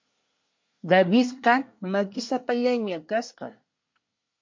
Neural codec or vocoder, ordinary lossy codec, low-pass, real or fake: codec, 24 kHz, 1 kbps, SNAC; MP3, 48 kbps; 7.2 kHz; fake